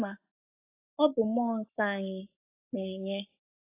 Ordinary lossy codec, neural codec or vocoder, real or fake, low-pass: AAC, 24 kbps; codec, 44.1 kHz, 7.8 kbps, Pupu-Codec; fake; 3.6 kHz